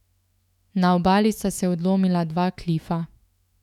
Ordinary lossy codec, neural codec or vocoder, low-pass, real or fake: none; autoencoder, 48 kHz, 128 numbers a frame, DAC-VAE, trained on Japanese speech; 19.8 kHz; fake